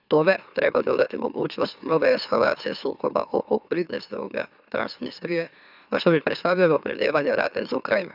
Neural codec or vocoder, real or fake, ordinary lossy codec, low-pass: autoencoder, 44.1 kHz, a latent of 192 numbers a frame, MeloTTS; fake; none; 5.4 kHz